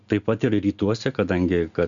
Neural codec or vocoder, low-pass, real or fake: none; 7.2 kHz; real